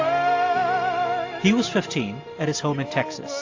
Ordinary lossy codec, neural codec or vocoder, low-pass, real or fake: AAC, 48 kbps; none; 7.2 kHz; real